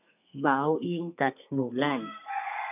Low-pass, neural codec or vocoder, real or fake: 3.6 kHz; codec, 32 kHz, 1.9 kbps, SNAC; fake